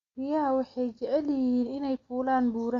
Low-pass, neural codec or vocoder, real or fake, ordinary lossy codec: 7.2 kHz; none; real; Opus, 64 kbps